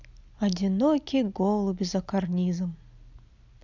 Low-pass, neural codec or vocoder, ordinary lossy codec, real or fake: 7.2 kHz; none; none; real